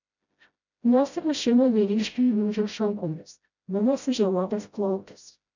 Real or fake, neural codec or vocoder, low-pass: fake; codec, 16 kHz, 0.5 kbps, FreqCodec, smaller model; 7.2 kHz